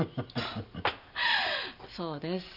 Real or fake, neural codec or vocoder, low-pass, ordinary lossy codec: real; none; 5.4 kHz; none